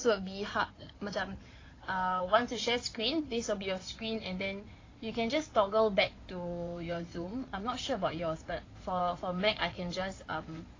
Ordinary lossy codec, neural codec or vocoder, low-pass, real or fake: AAC, 32 kbps; codec, 16 kHz in and 24 kHz out, 2.2 kbps, FireRedTTS-2 codec; 7.2 kHz; fake